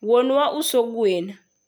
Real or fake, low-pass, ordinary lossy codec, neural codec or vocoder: real; none; none; none